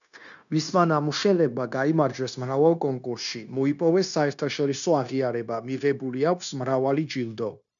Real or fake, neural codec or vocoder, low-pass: fake; codec, 16 kHz, 0.9 kbps, LongCat-Audio-Codec; 7.2 kHz